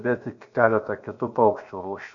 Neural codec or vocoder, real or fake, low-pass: codec, 16 kHz, about 1 kbps, DyCAST, with the encoder's durations; fake; 7.2 kHz